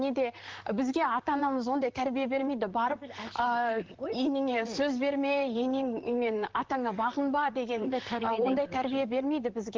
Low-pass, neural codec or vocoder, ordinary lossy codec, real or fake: 7.2 kHz; vocoder, 44.1 kHz, 128 mel bands, Pupu-Vocoder; Opus, 16 kbps; fake